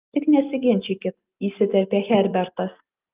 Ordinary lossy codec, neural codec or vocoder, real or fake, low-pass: Opus, 24 kbps; none; real; 3.6 kHz